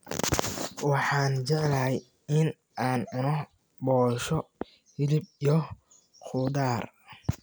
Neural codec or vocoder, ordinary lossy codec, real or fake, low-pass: none; none; real; none